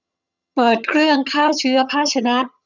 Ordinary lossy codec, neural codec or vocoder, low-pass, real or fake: none; vocoder, 22.05 kHz, 80 mel bands, HiFi-GAN; 7.2 kHz; fake